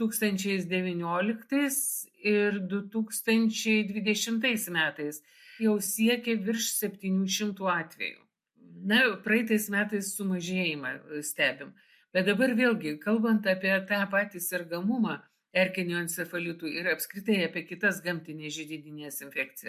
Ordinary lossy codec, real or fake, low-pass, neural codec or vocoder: MP3, 64 kbps; real; 14.4 kHz; none